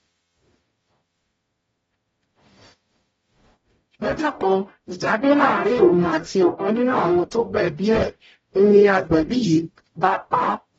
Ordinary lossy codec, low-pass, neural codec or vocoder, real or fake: AAC, 24 kbps; 19.8 kHz; codec, 44.1 kHz, 0.9 kbps, DAC; fake